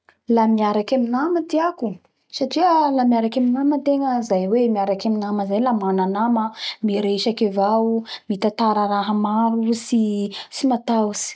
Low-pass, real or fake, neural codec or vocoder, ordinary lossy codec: none; real; none; none